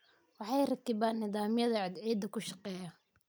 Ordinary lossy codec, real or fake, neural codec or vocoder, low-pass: none; real; none; none